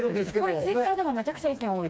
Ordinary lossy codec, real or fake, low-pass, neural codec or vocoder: none; fake; none; codec, 16 kHz, 2 kbps, FreqCodec, smaller model